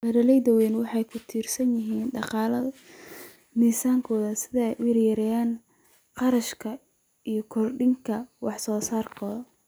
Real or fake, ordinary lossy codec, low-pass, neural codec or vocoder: real; none; none; none